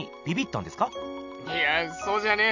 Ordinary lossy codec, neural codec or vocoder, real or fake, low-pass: none; none; real; 7.2 kHz